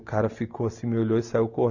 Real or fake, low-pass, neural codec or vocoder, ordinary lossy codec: real; 7.2 kHz; none; none